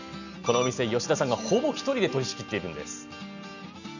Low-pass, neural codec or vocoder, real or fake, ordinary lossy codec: 7.2 kHz; none; real; none